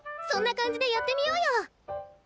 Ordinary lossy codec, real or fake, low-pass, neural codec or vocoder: none; real; none; none